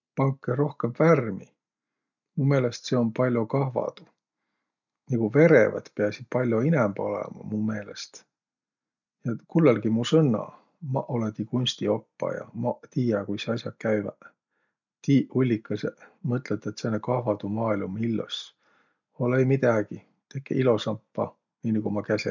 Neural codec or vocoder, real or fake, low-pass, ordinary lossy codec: none; real; 7.2 kHz; none